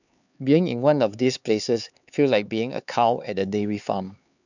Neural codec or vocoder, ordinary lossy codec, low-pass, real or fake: codec, 16 kHz, 4 kbps, X-Codec, HuBERT features, trained on LibriSpeech; none; 7.2 kHz; fake